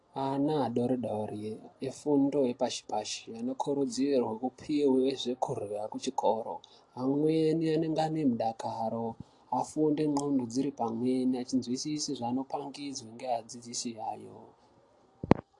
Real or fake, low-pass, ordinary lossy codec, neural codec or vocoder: real; 10.8 kHz; AAC, 48 kbps; none